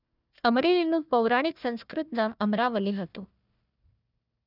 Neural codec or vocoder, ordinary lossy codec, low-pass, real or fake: codec, 16 kHz, 1 kbps, FunCodec, trained on Chinese and English, 50 frames a second; none; 5.4 kHz; fake